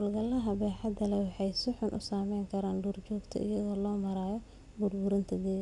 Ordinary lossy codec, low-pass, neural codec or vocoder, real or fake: none; 10.8 kHz; none; real